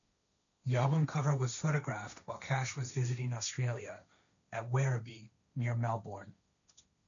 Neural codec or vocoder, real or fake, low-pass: codec, 16 kHz, 1.1 kbps, Voila-Tokenizer; fake; 7.2 kHz